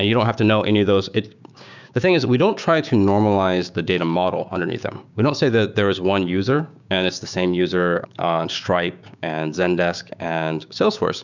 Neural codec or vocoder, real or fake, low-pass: codec, 16 kHz, 6 kbps, DAC; fake; 7.2 kHz